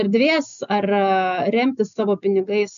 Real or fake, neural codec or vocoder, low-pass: real; none; 7.2 kHz